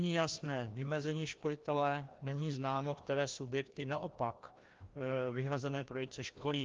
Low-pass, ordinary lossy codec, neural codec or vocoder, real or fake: 7.2 kHz; Opus, 32 kbps; codec, 16 kHz, 1 kbps, FreqCodec, larger model; fake